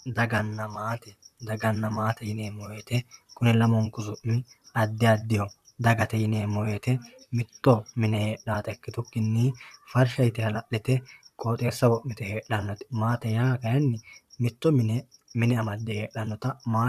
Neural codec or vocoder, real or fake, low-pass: vocoder, 44.1 kHz, 128 mel bands, Pupu-Vocoder; fake; 14.4 kHz